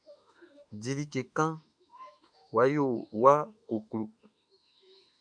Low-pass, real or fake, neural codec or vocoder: 9.9 kHz; fake; autoencoder, 48 kHz, 32 numbers a frame, DAC-VAE, trained on Japanese speech